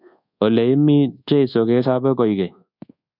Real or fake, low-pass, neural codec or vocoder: fake; 5.4 kHz; codec, 24 kHz, 1.2 kbps, DualCodec